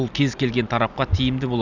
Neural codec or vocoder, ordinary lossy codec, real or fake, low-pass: none; none; real; 7.2 kHz